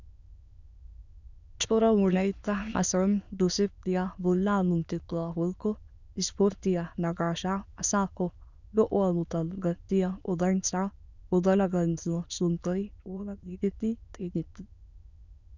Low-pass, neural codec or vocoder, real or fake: 7.2 kHz; autoencoder, 22.05 kHz, a latent of 192 numbers a frame, VITS, trained on many speakers; fake